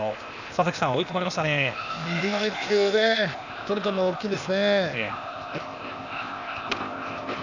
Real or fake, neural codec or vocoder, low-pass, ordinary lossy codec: fake; codec, 16 kHz, 0.8 kbps, ZipCodec; 7.2 kHz; none